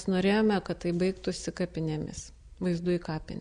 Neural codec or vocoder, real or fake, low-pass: none; real; 9.9 kHz